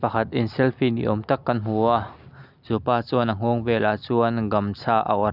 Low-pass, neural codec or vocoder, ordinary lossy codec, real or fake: 5.4 kHz; none; none; real